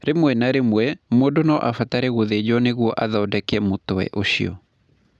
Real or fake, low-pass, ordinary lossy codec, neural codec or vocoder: real; none; none; none